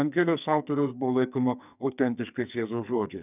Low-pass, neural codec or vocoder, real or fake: 3.6 kHz; codec, 44.1 kHz, 2.6 kbps, SNAC; fake